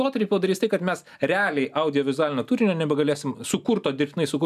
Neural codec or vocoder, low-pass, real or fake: none; 14.4 kHz; real